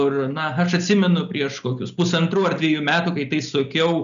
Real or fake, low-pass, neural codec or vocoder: real; 7.2 kHz; none